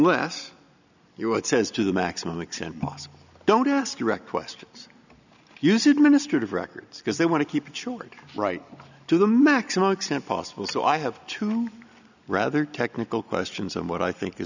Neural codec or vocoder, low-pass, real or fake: none; 7.2 kHz; real